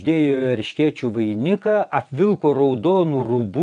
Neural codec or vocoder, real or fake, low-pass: vocoder, 22.05 kHz, 80 mel bands, WaveNeXt; fake; 9.9 kHz